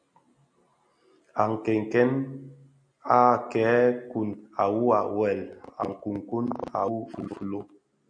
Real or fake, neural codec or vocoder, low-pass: real; none; 9.9 kHz